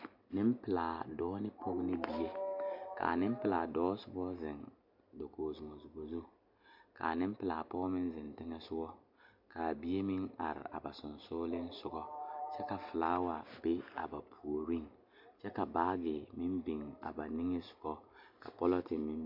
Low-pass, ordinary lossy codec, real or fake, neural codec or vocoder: 5.4 kHz; MP3, 48 kbps; real; none